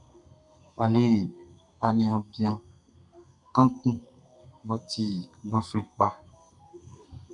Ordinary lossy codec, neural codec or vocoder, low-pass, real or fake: MP3, 96 kbps; codec, 32 kHz, 1.9 kbps, SNAC; 10.8 kHz; fake